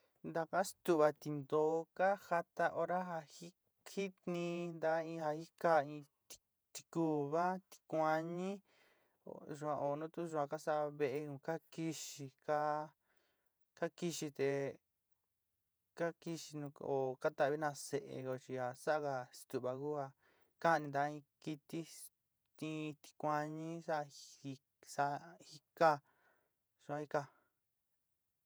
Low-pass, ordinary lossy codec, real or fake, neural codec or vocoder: none; none; fake; vocoder, 48 kHz, 128 mel bands, Vocos